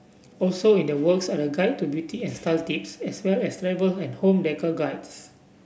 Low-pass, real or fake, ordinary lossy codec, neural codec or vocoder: none; real; none; none